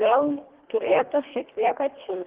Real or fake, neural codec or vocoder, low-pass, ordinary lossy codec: fake; codec, 24 kHz, 1.5 kbps, HILCodec; 3.6 kHz; Opus, 16 kbps